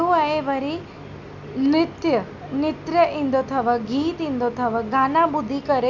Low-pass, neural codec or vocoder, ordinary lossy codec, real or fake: 7.2 kHz; none; none; real